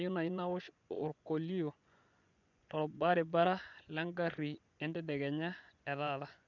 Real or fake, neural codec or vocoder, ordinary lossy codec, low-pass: fake; vocoder, 24 kHz, 100 mel bands, Vocos; none; 7.2 kHz